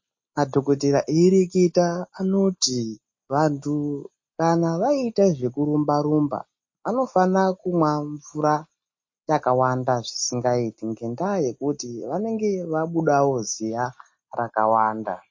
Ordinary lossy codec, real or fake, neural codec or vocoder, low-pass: MP3, 32 kbps; real; none; 7.2 kHz